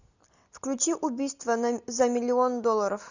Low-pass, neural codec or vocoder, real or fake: 7.2 kHz; none; real